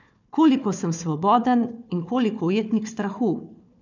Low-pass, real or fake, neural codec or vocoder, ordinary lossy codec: 7.2 kHz; fake; codec, 16 kHz, 4 kbps, FunCodec, trained on Chinese and English, 50 frames a second; none